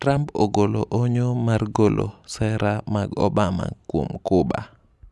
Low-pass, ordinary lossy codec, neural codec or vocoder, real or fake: none; none; none; real